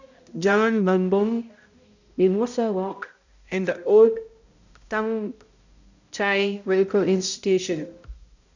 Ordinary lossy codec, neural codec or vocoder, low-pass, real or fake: none; codec, 16 kHz, 0.5 kbps, X-Codec, HuBERT features, trained on balanced general audio; 7.2 kHz; fake